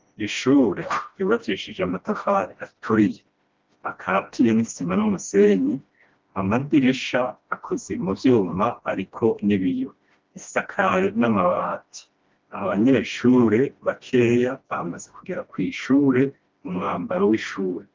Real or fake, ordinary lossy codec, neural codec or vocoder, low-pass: fake; Opus, 32 kbps; codec, 16 kHz, 1 kbps, FreqCodec, smaller model; 7.2 kHz